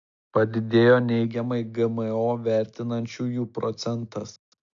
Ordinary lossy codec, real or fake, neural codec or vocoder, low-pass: AAC, 64 kbps; real; none; 7.2 kHz